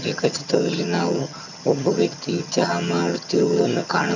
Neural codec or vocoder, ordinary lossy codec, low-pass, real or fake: vocoder, 22.05 kHz, 80 mel bands, HiFi-GAN; none; 7.2 kHz; fake